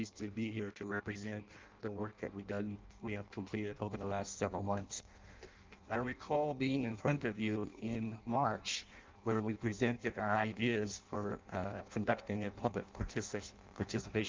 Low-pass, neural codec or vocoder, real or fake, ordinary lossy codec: 7.2 kHz; codec, 16 kHz in and 24 kHz out, 0.6 kbps, FireRedTTS-2 codec; fake; Opus, 24 kbps